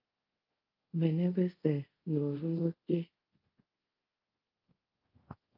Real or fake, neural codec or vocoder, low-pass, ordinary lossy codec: fake; codec, 24 kHz, 0.5 kbps, DualCodec; 5.4 kHz; Opus, 32 kbps